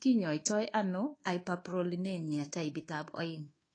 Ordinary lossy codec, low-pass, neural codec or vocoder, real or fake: AAC, 32 kbps; 9.9 kHz; codec, 24 kHz, 1.2 kbps, DualCodec; fake